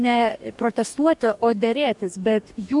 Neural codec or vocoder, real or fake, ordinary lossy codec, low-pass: codec, 44.1 kHz, 2.6 kbps, DAC; fake; MP3, 96 kbps; 10.8 kHz